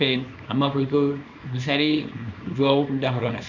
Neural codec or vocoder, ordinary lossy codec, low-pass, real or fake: codec, 24 kHz, 0.9 kbps, WavTokenizer, small release; none; 7.2 kHz; fake